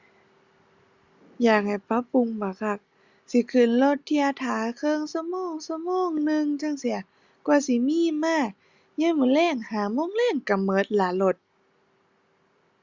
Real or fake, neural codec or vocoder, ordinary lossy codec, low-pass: real; none; Opus, 64 kbps; 7.2 kHz